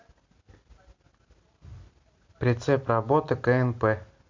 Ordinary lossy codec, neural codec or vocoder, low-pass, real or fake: MP3, 48 kbps; none; 7.2 kHz; real